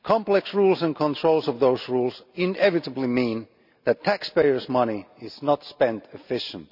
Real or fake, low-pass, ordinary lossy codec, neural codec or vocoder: real; 5.4 kHz; none; none